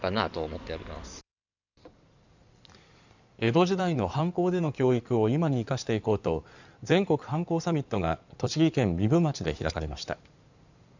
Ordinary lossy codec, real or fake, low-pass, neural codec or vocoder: none; fake; 7.2 kHz; codec, 16 kHz in and 24 kHz out, 2.2 kbps, FireRedTTS-2 codec